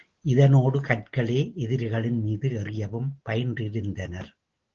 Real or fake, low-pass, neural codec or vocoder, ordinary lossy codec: real; 7.2 kHz; none; Opus, 16 kbps